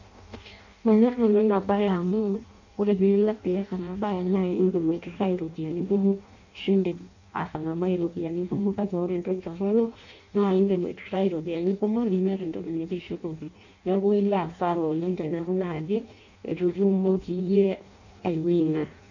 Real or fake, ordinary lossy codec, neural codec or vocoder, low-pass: fake; none; codec, 16 kHz in and 24 kHz out, 0.6 kbps, FireRedTTS-2 codec; 7.2 kHz